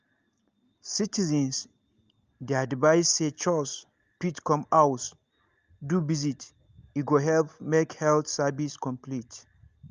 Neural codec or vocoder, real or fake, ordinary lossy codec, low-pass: none; real; Opus, 24 kbps; 7.2 kHz